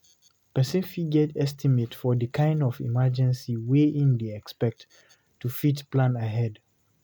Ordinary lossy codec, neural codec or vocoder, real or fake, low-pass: none; none; real; none